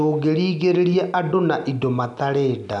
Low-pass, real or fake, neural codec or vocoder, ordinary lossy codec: 10.8 kHz; real; none; none